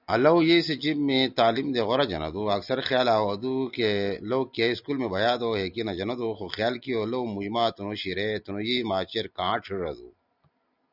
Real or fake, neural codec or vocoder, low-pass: real; none; 5.4 kHz